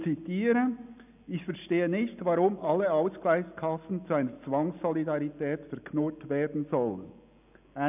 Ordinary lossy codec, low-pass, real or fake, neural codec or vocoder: none; 3.6 kHz; real; none